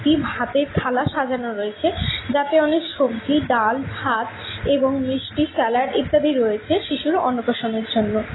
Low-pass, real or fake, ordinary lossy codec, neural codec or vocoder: 7.2 kHz; real; AAC, 16 kbps; none